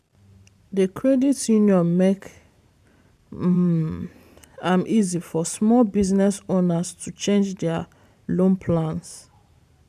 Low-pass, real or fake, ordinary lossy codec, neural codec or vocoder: 14.4 kHz; fake; none; vocoder, 44.1 kHz, 128 mel bands every 512 samples, BigVGAN v2